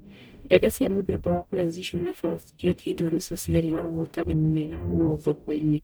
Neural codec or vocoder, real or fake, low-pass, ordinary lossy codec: codec, 44.1 kHz, 0.9 kbps, DAC; fake; none; none